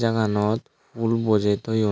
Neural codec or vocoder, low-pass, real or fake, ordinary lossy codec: none; none; real; none